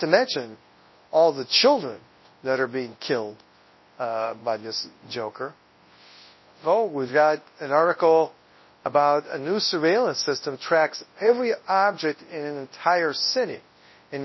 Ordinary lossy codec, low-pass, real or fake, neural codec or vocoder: MP3, 24 kbps; 7.2 kHz; fake; codec, 24 kHz, 0.9 kbps, WavTokenizer, large speech release